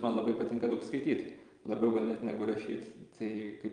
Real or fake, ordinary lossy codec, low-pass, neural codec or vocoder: fake; Opus, 32 kbps; 9.9 kHz; vocoder, 22.05 kHz, 80 mel bands, Vocos